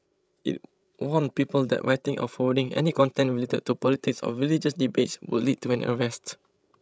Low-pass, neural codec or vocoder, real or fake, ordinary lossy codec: none; codec, 16 kHz, 16 kbps, FreqCodec, larger model; fake; none